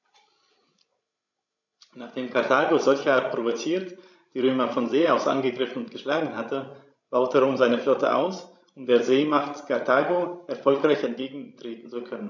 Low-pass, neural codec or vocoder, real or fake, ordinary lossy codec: 7.2 kHz; codec, 16 kHz, 16 kbps, FreqCodec, larger model; fake; none